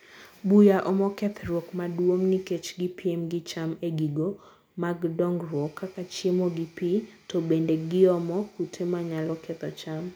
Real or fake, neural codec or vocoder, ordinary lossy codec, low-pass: real; none; none; none